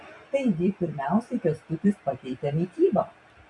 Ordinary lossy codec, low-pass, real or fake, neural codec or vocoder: MP3, 96 kbps; 10.8 kHz; fake; vocoder, 44.1 kHz, 128 mel bands every 256 samples, BigVGAN v2